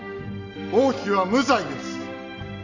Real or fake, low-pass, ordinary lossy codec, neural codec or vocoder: real; 7.2 kHz; none; none